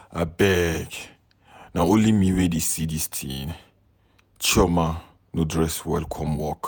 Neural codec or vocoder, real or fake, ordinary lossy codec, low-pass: vocoder, 48 kHz, 128 mel bands, Vocos; fake; none; none